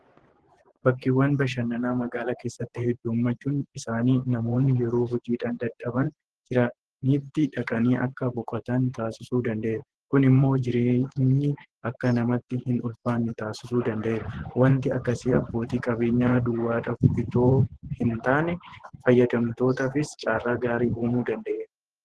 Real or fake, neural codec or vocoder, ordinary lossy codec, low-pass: fake; vocoder, 24 kHz, 100 mel bands, Vocos; Opus, 16 kbps; 10.8 kHz